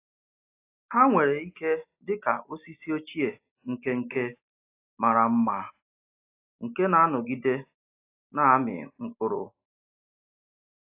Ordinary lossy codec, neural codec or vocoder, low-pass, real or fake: AAC, 24 kbps; none; 3.6 kHz; real